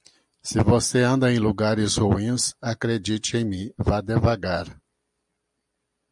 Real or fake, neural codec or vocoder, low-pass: real; none; 10.8 kHz